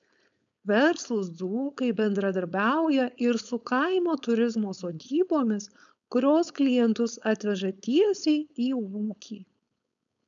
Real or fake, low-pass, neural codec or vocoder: fake; 7.2 kHz; codec, 16 kHz, 4.8 kbps, FACodec